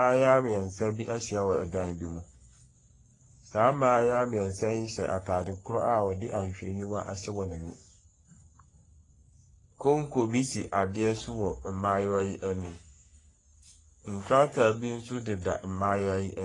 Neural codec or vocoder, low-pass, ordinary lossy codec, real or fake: codec, 44.1 kHz, 3.4 kbps, Pupu-Codec; 10.8 kHz; AAC, 32 kbps; fake